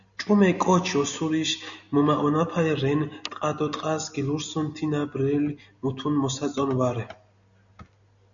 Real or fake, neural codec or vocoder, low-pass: real; none; 7.2 kHz